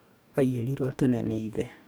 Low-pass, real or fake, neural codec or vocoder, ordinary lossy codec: none; fake; codec, 44.1 kHz, 2.6 kbps, DAC; none